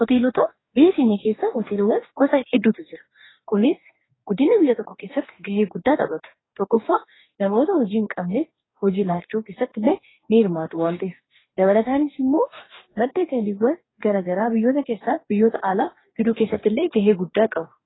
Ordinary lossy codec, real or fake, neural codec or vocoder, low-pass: AAC, 16 kbps; fake; codec, 44.1 kHz, 2.6 kbps, DAC; 7.2 kHz